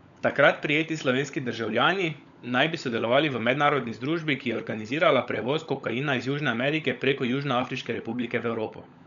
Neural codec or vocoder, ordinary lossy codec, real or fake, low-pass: codec, 16 kHz, 16 kbps, FunCodec, trained on LibriTTS, 50 frames a second; none; fake; 7.2 kHz